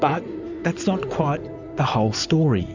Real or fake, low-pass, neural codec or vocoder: fake; 7.2 kHz; codec, 16 kHz in and 24 kHz out, 2.2 kbps, FireRedTTS-2 codec